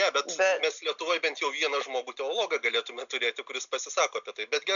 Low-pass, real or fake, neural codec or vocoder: 7.2 kHz; real; none